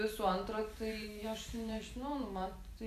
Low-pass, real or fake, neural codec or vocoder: 14.4 kHz; fake; vocoder, 44.1 kHz, 128 mel bands every 512 samples, BigVGAN v2